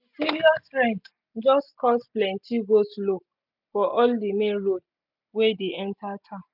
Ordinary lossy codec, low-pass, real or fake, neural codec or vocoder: none; 5.4 kHz; real; none